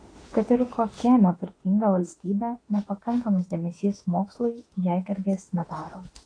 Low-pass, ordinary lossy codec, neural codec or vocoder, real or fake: 9.9 kHz; AAC, 32 kbps; autoencoder, 48 kHz, 32 numbers a frame, DAC-VAE, trained on Japanese speech; fake